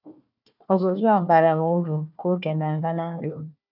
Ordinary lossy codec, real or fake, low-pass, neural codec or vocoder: none; fake; 5.4 kHz; codec, 16 kHz, 1 kbps, FunCodec, trained on Chinese and English, 50 frames a second